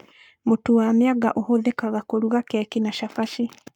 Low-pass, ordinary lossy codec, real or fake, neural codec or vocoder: 19.8 kHz; none; fake; codec, 44.1 kHz, 7.8 kbps, Pupu-Codec